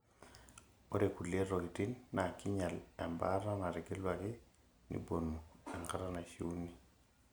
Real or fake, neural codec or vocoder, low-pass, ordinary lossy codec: real; none; none; none